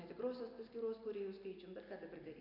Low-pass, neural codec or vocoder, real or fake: 5.4 kHz; none; real